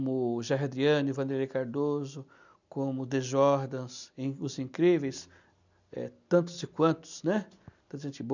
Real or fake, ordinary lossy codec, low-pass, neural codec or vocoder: real; none; 7.2 kHz; none